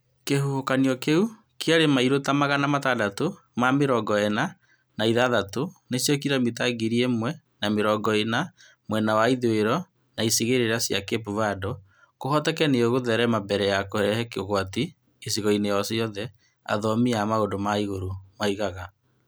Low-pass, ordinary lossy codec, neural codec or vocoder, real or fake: none; none; none; real